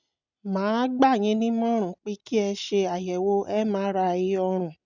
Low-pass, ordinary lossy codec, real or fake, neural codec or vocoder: 7.2 kHz; none; real; none